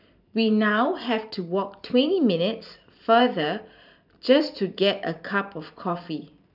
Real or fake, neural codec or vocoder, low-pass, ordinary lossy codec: fake; vocoder, 44.1 kHz, 128 mel bands every 512 samples, BigVGAN v2; 5.4 kHz; none